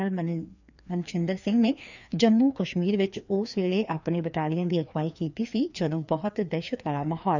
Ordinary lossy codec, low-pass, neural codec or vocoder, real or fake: none; 7.2 kHz; codec, 16 kHz, 2 kbps, FreqCodec, larger model; fake